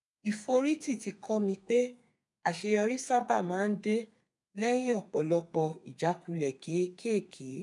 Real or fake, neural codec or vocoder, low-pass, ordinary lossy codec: fake; codec, 32 kHz, 1.9 kbps, SNAC; 10.8 kHz; none